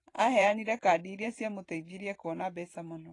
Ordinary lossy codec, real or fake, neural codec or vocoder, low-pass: AAC, 32 kbps; fake; vocoder, 44.1 kHz, 128 mel bands every 512 samples, BigVGAN v2; 10.8 kHz